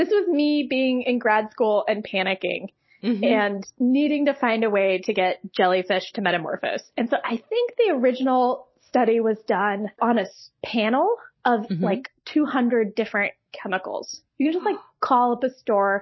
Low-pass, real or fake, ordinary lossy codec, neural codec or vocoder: 7.2 kHz; real; MP3, 24 kbps; none